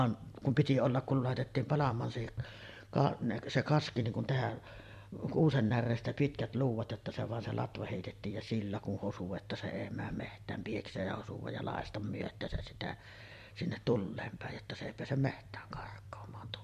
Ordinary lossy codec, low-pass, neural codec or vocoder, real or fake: MP3, 64 kbps; 14.4 kHz; vocoder, 44.1 kHz, 128 mel bands every 256 samples, BigVGAN v2; fake